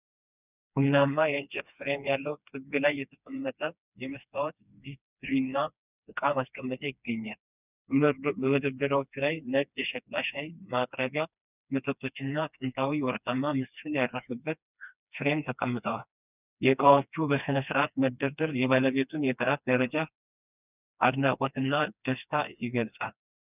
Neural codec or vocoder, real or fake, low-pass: codec, 16 kHz, 2 kbps, FreqCodec, smaller model; fake; 3.6 kHz